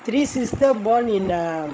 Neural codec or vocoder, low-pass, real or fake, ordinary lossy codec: codec, 16 kHz, 16 kbps, FreqCodec, larger model; none; fake; none